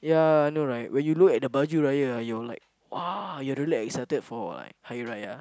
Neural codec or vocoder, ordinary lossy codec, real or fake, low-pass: none; none; real; none